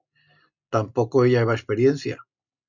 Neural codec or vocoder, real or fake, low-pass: none; real; 7.2 kHz